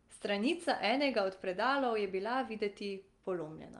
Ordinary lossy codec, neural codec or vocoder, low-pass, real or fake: Opus, 32 kbps; none; 10.8 kHz; real